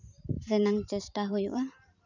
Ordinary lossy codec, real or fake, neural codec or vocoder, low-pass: none; fake; vocoder, 44.1 kHz, 128 mel bands every 512 samples, BigVGAN v2; 7.2 kHz